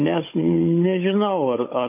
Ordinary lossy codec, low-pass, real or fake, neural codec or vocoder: MP3, 24 kbps; 3.6 kHz; fake; codec, 16 kHz, 16 kbps, FunCodec, trained on Chinese and English, 50 frames a second